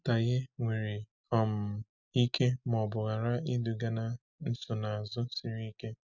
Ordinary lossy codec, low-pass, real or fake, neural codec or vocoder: none; 7.2 kHz; real; none